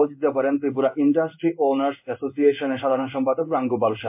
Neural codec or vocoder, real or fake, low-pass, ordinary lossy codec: codec, 16 kHz in and 24 kHz out, 1 kbps, XY-Tokenizer; fake; 3.6 kHz; MP3, 32 kbps